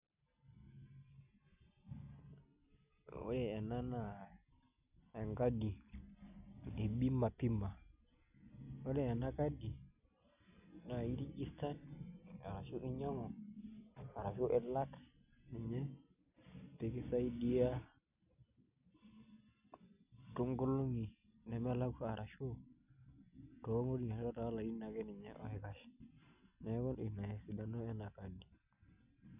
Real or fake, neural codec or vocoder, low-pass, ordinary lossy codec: real; none; 3.6 kHz; none